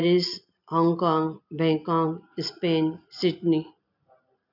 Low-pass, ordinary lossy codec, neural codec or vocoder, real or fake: 5.4 kHz; none; none; real